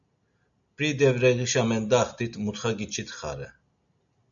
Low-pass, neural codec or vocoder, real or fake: 7.2 kHz; none; real